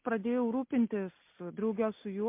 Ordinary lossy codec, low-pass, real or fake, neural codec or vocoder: MP3, 32 kbps; 3.6 kHz; real; none